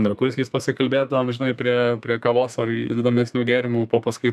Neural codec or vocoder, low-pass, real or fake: codec, 32 kHz, 1.9 kbps, SNAC; 14.4 kHz; fake